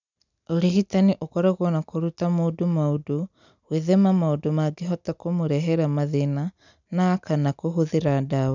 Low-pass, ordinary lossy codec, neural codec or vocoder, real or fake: 7.2 kHz; none; none; real